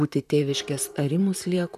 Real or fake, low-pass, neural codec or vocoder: fake; 14.4 kHz; vocoder, 44.1 kHz, 128 mel bands, Pupu-Vocoder